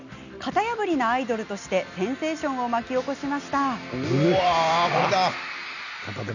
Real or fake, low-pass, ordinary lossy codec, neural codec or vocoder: real; 7.2 kHz; none; none